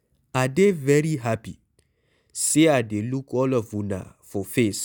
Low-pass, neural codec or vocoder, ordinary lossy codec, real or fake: none; none; none; real